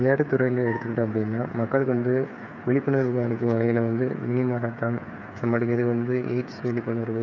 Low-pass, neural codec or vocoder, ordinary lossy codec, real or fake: 7.2 kHz; codec, 16 kHz, 8 kbps, FreqCodec, smaller model; none; fake